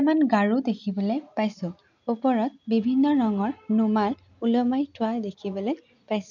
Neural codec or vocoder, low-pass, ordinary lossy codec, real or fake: none; 7.2 kHz; none; real